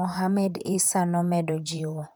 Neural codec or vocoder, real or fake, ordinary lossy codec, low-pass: vocoder, 44.1 kHz, 128 mel bands, Pupu-Vocoder; fake; none; none